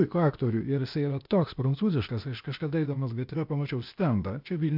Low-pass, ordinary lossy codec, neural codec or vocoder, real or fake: 5.4 kHz; MP3, 48 kbps; codec, 16 kHz, 0.8 kbps, ZipCodec; fake